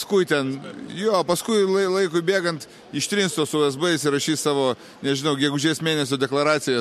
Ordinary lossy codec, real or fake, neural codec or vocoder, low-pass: MP3, 64 kbps; real; none; 14.4 kHz